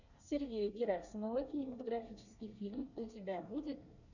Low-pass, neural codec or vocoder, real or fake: 7.2 kHz; codec, 24 kHz, 1 kbps, SNAC; fake